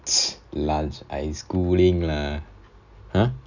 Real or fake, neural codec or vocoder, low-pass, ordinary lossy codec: real; none; 7.2 kHz; none